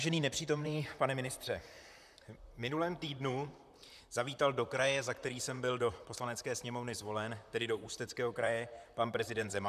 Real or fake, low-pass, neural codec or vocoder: fake; 14.4 kHz; vocoder, 44.1 kHz, 128 mel bands, Pupu-Vocoder